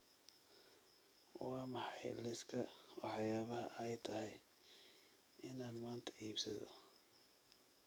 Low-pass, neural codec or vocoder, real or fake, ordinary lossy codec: none; codec, 44.1 kHz, 7.8 kbps, DAC; fake; none